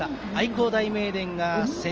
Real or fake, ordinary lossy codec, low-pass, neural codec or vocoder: real; Opus, 24 kbps; 7.2 kHz; none